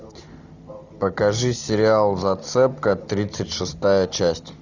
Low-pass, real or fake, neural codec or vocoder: 7.2 kHz; real; none